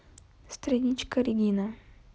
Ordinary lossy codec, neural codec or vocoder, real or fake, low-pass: none; none; real; none